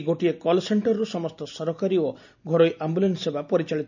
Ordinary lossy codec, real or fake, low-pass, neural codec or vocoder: none; real; 7.2 kHz; none